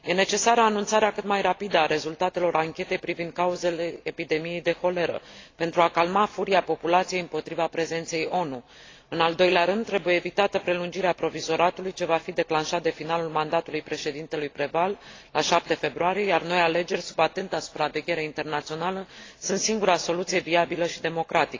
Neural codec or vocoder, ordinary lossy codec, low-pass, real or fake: none; AAC, 32 kbps; 7.2 kHz; real